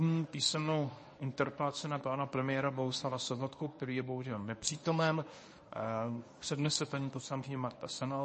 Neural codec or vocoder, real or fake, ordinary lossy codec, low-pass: codec, 24 kHz, 0.9 kbps, WavTokenizer, medium speech release version 1; fake; MP3, 32 kbps; 10.8 kHz